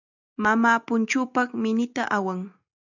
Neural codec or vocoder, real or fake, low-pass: none; real; 7.2 kHz